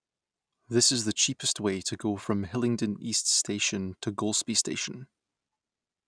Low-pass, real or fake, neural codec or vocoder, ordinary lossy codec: 9.9 kHz; real; none; none